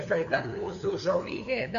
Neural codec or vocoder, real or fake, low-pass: codec, 16 kHz, 2 kbps, FunCodec, trained on LibriTTS, 25 frames a second; fake; 7.2 kHz